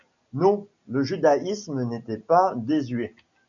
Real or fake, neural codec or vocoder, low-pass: real; none; 7.2 kHz